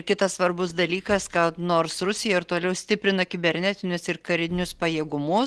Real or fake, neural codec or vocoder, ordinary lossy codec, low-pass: real; none; Opus, 16 kbps; 10.8 kHz